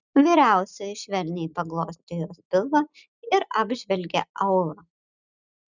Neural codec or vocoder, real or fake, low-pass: none; real; 7.2 kHz